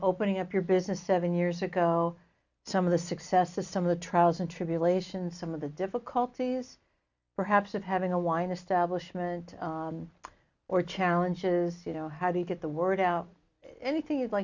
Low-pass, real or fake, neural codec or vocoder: 7.2 kHz; real; none